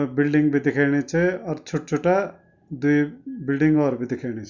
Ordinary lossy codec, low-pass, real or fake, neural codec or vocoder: none; 7.2 kHz; real; none